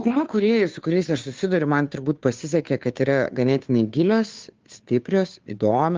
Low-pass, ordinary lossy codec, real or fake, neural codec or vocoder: 7.2 kHz; Opus, 24 kbps; fake; codec, 16 kHz, 2 kbps, FunCodec, trained on Chinese and English, 25 frames a second